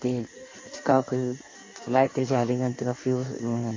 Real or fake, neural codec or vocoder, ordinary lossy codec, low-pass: fake; codec, 16 kHz in and 24 kHz out, 1.1 kbps, FireRedTTS-2 codec; MP3, 48 kbps; 7.2 kHz